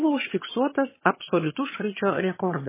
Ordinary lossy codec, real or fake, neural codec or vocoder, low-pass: MP3, 16 kbps; fake; vocoder, 22.05 kHz, 80 mel bands, HiFi-GAN; 3.6 kHz